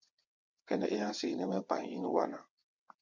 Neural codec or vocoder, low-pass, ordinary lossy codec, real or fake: vocoder, 22.05 kHz, 80 mel bands, WaveNeXt; 7.2 kHz; MP3, 64 kbps; fake